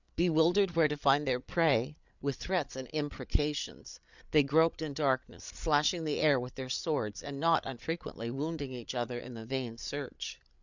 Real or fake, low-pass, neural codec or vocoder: fake; 7.2 kHz; codec, 16 kHz, 8 kbps, FreqCodec, larger model